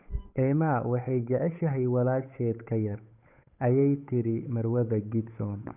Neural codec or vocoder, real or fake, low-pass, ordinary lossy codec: codec, 16 kHz, 8 kbps, FunCodec, trained on Chinese and English, 25 frames a second; fake; 3.6 kHz; none